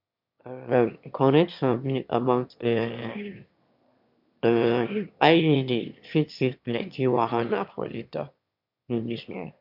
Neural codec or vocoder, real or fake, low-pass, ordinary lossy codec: autoencoder, 22.05 kHz, a latent of 192 numbers a frame, VITS, trained on one speaker; fake; 5.4 kHz; MP3, 48 kbps